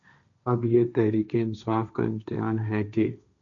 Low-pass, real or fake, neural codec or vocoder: 7.2 kHz; fake; codec, 16 kHz, 1.1 kbps, Voila-Tokenizer